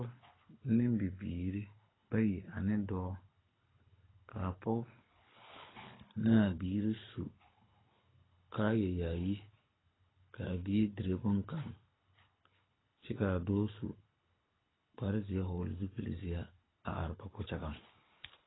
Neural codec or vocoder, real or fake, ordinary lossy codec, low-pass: codec, 24 kHz, 6 kbps, HILCodec; fake; AAC, 16 kbps; 7.2 kHz